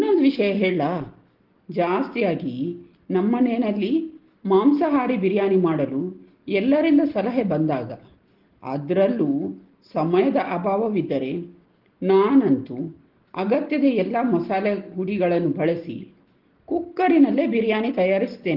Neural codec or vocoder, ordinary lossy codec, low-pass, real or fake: none; Opus, 16 kbps; 5.4 kHz; real